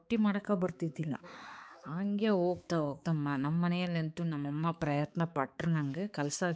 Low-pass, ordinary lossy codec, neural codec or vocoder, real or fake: none; none; codec, 16 kHz, 4 kbps, X-Codec, HuBERT features, trained on balanced general audio; fake